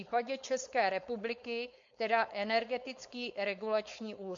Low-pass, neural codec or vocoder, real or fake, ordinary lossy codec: 7.2 kHz; codec, 16 kHz, 4.8 kbps, FACodec; fake; MP3, 48 kbps